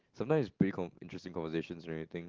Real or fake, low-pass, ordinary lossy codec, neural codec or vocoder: real; 7.2 kHz; Opus, 16 kbps; none